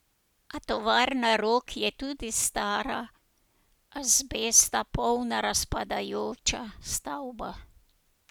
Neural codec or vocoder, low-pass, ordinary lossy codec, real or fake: none; none; none; real